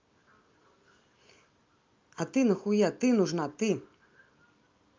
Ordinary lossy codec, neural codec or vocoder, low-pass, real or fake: Opus, 24 kbps; none; 7.2 kHz; real